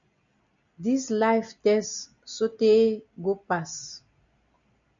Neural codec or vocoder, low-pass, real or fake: none; 7.2 kHz; real